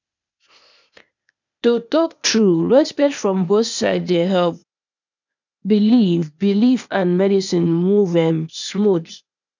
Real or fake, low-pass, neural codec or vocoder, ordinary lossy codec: fake; 7.2 kHz; codec, 16 kHz, 0.8 kbps, ZipCodec; none